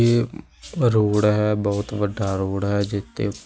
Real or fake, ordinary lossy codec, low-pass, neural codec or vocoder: real; none; none; none